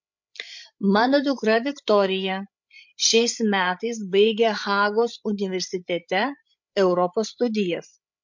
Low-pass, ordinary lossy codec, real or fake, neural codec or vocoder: 7.2 kHz; MP3, 48 kbps; fake; codec, 16 kHz, 16 kbps, FreqCodec, larger model